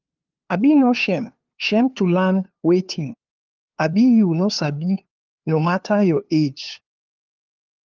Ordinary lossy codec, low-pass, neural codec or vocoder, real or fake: Opus, 32 kbps; 7.2 kHz; codec, 16 kHz, 2 kbps, FunCodec, trained on LibriTTS, 25 frames a second; fake